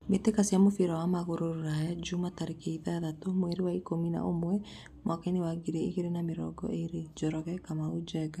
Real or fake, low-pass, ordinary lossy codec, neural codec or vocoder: real; 14.4 kHz; none; none